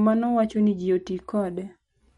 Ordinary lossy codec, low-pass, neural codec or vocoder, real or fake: MP3, 48 kbps; 19.8 kHz; none; real